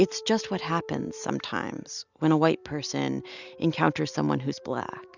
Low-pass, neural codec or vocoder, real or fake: 7.2 kHz; none; real